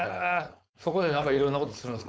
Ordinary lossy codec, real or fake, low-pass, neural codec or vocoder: none; fake; none; codec, 16 kHz, 4.8 kbps, FACodec